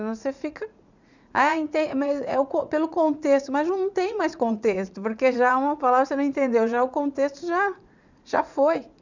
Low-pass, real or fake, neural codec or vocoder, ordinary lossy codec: 7.2 kHz; fake; vocoder, 44.1 kHz, 80 mel bands, Vocos; none